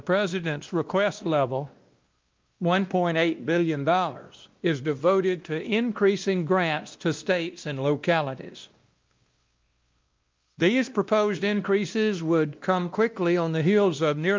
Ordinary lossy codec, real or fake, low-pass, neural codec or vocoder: Opus, 24 kbps; fake; 7.2 kHz; codec, 16 kHz, 1 kbps, X-Codec, WavLM features, trained on Multilingual LibriSpeech